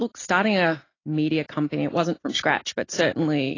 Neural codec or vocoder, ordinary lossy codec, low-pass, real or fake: none; AAC, 32 kbps; 7.2 kHz; real